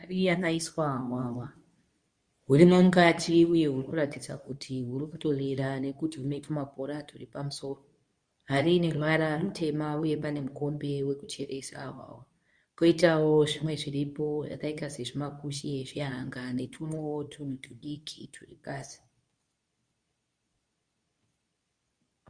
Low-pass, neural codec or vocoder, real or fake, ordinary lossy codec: 9.9 kHz; codec, 24 kHz, 0.9 kbps, WavTokenizer, medium speech release version 2; fake; Opus, 64 kbps